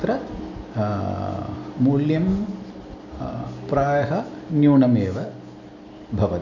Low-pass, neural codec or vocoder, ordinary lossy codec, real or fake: 7.2 kHz; none; none; real